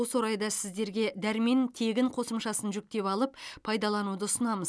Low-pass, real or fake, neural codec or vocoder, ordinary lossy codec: none; real; none; none